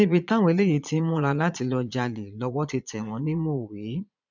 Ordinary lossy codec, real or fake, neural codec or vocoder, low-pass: none; fake; vocoder, 22.05 kHz, 80 mel bands, Vocos; 7.2 kHz